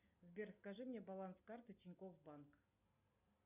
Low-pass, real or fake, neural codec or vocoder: 3.6 kHz; real; none